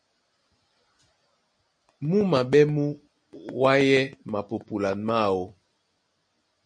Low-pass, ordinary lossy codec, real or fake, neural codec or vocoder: 9.9 kHz; MP3, 48 kbps; real; none